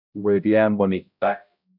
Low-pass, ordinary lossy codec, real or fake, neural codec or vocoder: 5.4 kHz; AAC, 48 kbps; fake; codec, 16 kHz, 0.5 kbps, X-Codec, HuBERT features, trained on balanced general audio